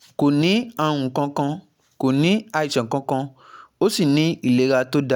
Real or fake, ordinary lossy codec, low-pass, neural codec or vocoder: real; none; 19.8 kHz; none